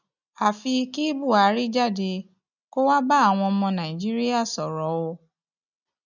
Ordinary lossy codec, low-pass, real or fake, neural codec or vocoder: none; 7.2 kHz; real; none